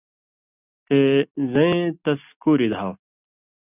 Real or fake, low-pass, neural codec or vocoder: real; 3.6 kHz; none